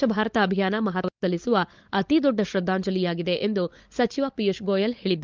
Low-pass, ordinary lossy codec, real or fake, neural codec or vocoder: 7.2 kHz; Opus, 24 kbps; fake; codec, 16 kHz, 16 kbps, FunCodec, trained on LibriTTS, 50 frames a second